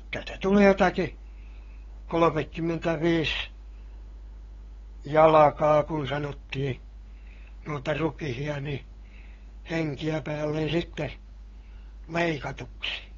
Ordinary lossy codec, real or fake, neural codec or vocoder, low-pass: AAC, 24 kbps; fake; codec, 16 kHz, 8 kbps, FunCodec, trained on LibriTTS, 25 frames a second; 7.2 kHz